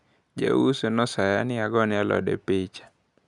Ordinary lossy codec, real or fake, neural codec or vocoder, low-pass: none; real; none; 10.8 kHz